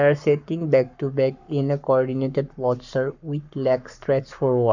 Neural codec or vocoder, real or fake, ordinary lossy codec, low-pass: codec, 44.1 kHz, 7.8 kbps, Pupu-Codec; fake; none; 7.2 kHz